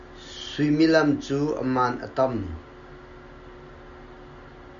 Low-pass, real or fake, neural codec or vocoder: 7.2 kHz; real; none